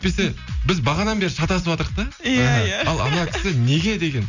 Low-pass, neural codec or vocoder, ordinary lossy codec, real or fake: 7.2 kHz; none; none; real